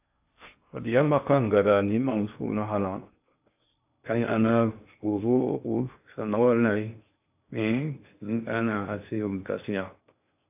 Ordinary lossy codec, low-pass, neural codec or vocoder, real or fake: AAC, 32 kbps; 3.6 kHz; codec, 16 kHz in and 24 kHz out, 0.6 kbps, FocalCodec, streaming, 2048 codes; fake